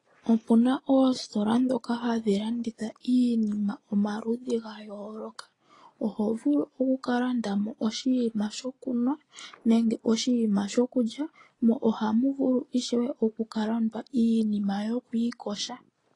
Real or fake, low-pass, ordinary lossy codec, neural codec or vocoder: real; 10.8 kHz; AAC, 32 kbps; none